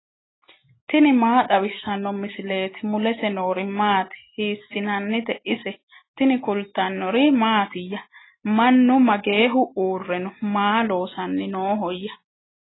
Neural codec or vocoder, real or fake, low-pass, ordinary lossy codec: none; real; 7.2 kHz; AAC, 16 kbps